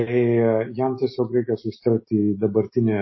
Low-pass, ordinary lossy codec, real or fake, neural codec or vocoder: 7.2 kHz; MP3, 24 kbps; real; none